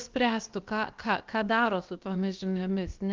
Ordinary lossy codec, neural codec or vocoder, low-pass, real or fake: Opus, 32 kbps; codec, 16 kHz, 0.7 kbps, FocalCodec; 7.2 kHz; fake